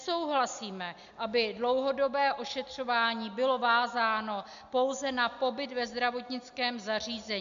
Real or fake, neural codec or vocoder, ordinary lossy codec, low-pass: real; none; MP3, 64 kbps; 7.2 kHz